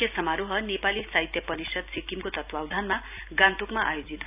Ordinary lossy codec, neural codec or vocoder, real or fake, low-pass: AAC, 32 kbps; none; real; 3.6 kHz